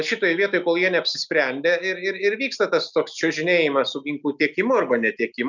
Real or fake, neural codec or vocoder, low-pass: real; none; 7.2 kHz